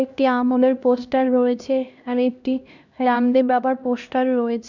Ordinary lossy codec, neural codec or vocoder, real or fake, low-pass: none; codec, 16 kHz, 1 kbps, X-Codec, HuBERT features, trained on LibriSpeech; fake; 7.2 kHz